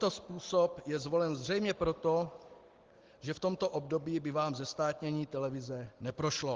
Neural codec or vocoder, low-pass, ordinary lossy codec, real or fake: none; 7.2 kHz; Opus, 16 kbps; real